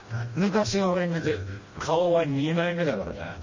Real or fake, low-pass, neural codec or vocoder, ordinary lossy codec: fake; 7.2 kHz; codec, 16 kHz, 1 kbps, FreqCodec, smaller model; MP3, 32 kbps